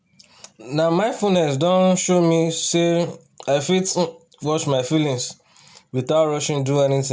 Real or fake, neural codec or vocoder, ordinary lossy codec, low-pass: real; none; none; none